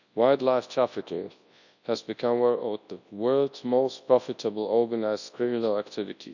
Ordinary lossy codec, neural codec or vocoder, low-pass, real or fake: none; codec, 24 kHz, 0.9 kbps, WavTokenizer, large speech release; 7.2 kHz; fake